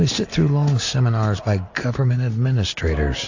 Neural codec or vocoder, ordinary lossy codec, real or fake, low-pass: none; AAC, 48 kbps; real; 7.2 kHz